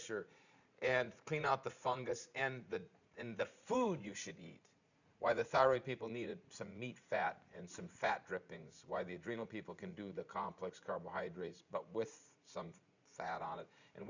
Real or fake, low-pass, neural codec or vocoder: fake; 7.2 kHz; vocoder, 44.1 kHz, 80 mel bands, Vocos